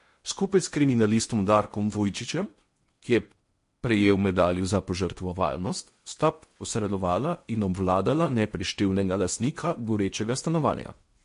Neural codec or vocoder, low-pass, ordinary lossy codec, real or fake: codec, 16 kHz in and 24 kHz out, 0.8 kbps, FocalCodec, streaming, 65536 codes; 10.8 kHz; MP3, 48 kbps; fake